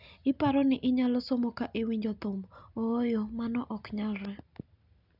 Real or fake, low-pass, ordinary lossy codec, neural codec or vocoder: real; 5.4 kHz; none; none